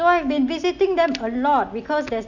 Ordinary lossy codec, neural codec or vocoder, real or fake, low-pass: none; none; real; 7.2 kHz